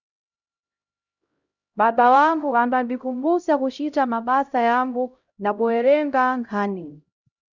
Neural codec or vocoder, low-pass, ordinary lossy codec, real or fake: codec, 16 kHz, 0.5 kbps, X-Codec, HuBERT features, trained on LibriSpeech; 7.2 kHz; Opus, 64 kbps; fake